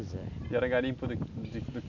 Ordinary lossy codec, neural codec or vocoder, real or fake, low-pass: none; none; real; 7.2 kHz